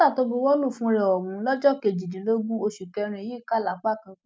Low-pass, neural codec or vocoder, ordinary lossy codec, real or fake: none; none; none; real